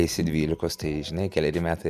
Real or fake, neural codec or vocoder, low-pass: real; none; 14.4 kHz